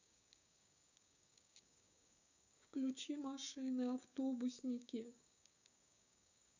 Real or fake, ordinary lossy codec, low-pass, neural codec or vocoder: fake; none; 7.2 kHz; codec, 16 kHz, 6 kbps, DAC